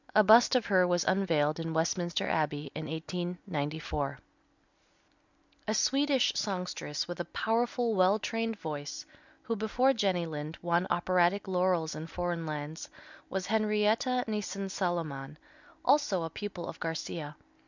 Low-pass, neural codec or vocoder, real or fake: 7.2 kHz; none; real